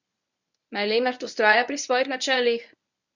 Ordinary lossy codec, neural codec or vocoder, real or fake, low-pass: none; codec, 24 kHz, 0.9 kbps, WavTokenizer, medium speech release version 1; fake; 7.2 kHz